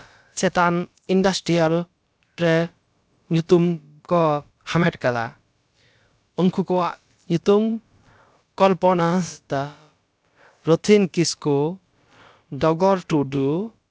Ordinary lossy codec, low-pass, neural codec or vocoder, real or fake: none; none; codec, 16 kHz, about 1 kbps, DyCAST, with the encoder's durations; fake